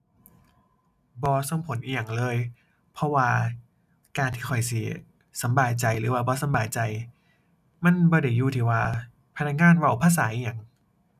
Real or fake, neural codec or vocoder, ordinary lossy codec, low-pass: real; none; none; 14.4 kHz